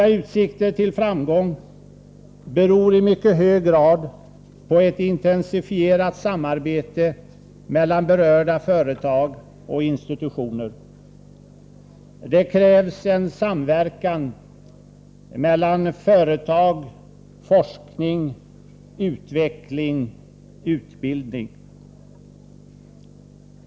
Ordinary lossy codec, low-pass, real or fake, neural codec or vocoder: none; none; real; none